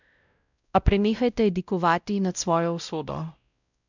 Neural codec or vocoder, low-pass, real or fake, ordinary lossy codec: codec, 16 kHz, 0.5 kbps, X-Codec, WavLM features, trained on Multilingual LibriSpeech; 7.2 kHz; fake; none